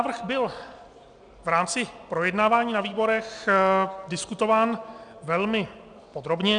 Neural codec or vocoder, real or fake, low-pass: none; real; 9.9 kHz